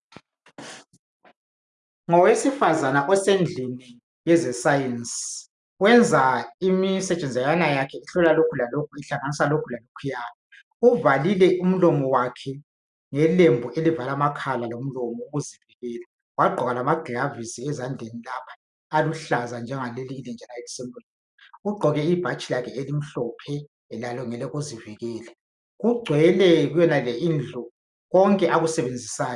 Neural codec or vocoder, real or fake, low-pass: none; real; 10.8 kHz